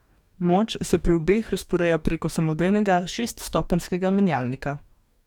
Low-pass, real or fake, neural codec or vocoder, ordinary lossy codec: 19.8 kHz; fake; codec, 44.1 kHz, 2.6 kbps, DAC; none